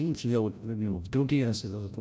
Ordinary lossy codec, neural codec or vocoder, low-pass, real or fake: none; codec, 16 kHz, 0.5 kbps, FreqCodec, larger model; none; fake